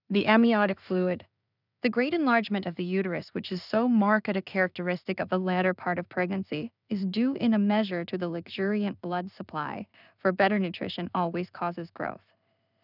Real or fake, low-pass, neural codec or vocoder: fake; 5.4 kHz; codec, 16 kHz in and 24 kHz out, 0.4 kbps, LongCat-Audio-Codec, two codebook decoder